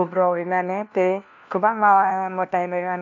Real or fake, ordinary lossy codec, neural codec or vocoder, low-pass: fake; none; codec, 16 kHz, 1 kbps, FunCodec, trained on LibriTTS, 50 frames a second; 7.2 kHz